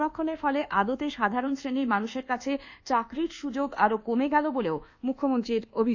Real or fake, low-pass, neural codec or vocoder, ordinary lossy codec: fake; 7.2 kHz; codec, 24 kHz, 1.2 kbps, DualCodec; AAC, 48 kbps